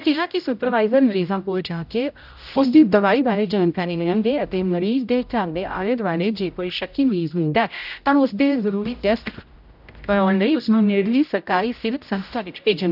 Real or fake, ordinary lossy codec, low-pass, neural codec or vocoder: fake; none; 5.4 kHz; codec, 16 kHz, 0.5 kbps, X-Codec, HuBERT features, trained on general audio